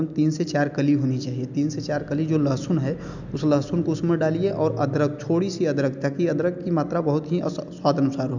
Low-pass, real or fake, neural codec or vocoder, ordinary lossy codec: 7.2 kHz; real; none; none